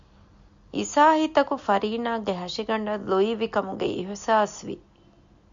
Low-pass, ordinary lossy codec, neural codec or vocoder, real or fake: 7.2 kHz; MP3, 64 kbps; none; real